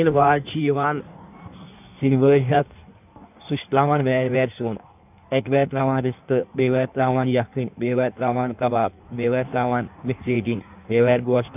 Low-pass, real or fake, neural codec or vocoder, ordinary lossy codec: 3.6 kHz; fake; codec, 16 kHz in and 24 kHz out, 1.1 kbps, FireRedTTS-2 codec; none